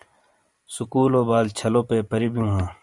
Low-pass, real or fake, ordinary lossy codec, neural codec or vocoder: 10.8 kHz; fake; Opus, 64 kbps; vocoder, 44.1 kHz, 128 mel bands every 512 samples, BigVGAN v2